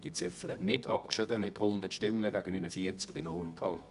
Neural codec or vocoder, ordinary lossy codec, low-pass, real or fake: codec, 24 kHz, 0.9 kbps, WavTokenizer, medium music audio release; none; 10.8 kHz; fake